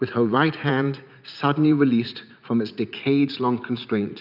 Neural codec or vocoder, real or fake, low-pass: vocoder, 44.1 kHz, 80 mel bands, Vocos; fake; 5.4 kHz